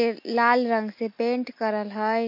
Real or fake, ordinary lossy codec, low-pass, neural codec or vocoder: real; MP3, 32 kbps; 5.4 kHz; none